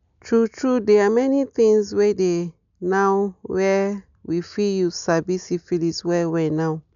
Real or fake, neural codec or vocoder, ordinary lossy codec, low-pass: real; none; none; 7.2 kHz